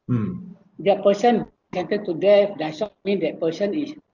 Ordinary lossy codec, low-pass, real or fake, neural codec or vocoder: Opus, 64 kbps; 7.2 kHz; real; none